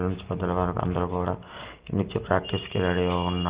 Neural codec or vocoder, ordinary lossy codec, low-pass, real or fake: none; Opus, 16 kbps; 3.6 kHz; real